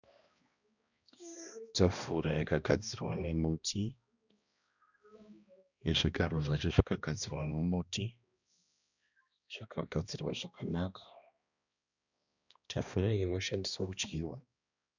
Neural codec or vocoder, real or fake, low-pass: codec, 16 kHz, 1 kbps, X-Codec, HuBERT features, trained on balanced general audio; fake; 7.2 kHz